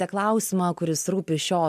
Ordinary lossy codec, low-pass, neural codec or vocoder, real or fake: MP3, 96 kbps; 14.4 kHz; none; real